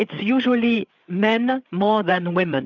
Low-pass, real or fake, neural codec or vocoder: 7.2 kHz; fake; codec, 16 kHz, 8 kbps, FreqCodec, larger model